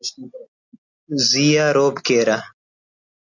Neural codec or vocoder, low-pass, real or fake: none; 7.2 kHz; real